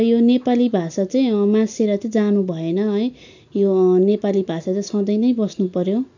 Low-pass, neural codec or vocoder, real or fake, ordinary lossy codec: 7.2 kHz; none; real; none